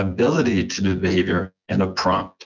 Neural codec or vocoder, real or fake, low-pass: vocoder, 24 kHz, 100 mel bands, Vocos; fake; 7.2 kHz